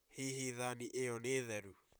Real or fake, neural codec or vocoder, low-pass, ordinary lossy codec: fake; vocoder, 44.1 kHz, 128 mel bands, Pupu-Vocoder; none; none